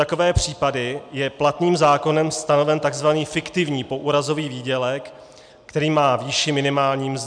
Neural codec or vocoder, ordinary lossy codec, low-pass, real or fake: none; MP3, 96 kbps; 9.9 kHz; real